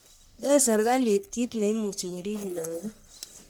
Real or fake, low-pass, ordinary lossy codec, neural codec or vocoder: fake; none; none; codec, 44.1 kHz, 1.7 kbps, Pupu-Codec